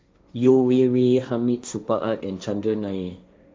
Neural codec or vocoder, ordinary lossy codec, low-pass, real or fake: codec, 16 kHz, 1.1 kbps, Voila-Tokenizer; none; none; fake